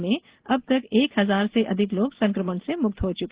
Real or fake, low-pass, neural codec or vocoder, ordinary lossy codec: real; 3.6 kHz; none; Opus, 16 kbps